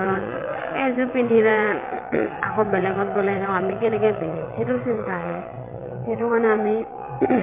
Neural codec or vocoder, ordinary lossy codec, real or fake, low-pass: vocoder, 22.05 kHz, 80 mel bands, WaveNeXt; MP3, 32 kbps; fake; 3.6 kHz